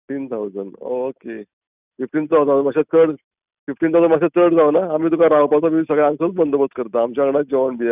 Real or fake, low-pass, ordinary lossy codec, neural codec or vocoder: real; 3.6 kHz; none; none